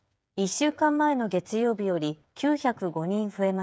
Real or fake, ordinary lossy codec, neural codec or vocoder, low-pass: fake; none; codec, 16 kHz, 8 kbps, FreqCodec, smaller model; none